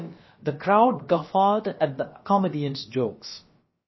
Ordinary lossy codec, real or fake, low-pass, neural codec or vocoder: MP3, 24 kbps; fake; 7.2 kHz; codec, 16 kHz, about 1 kbps, DyCAST, with the encoder's durations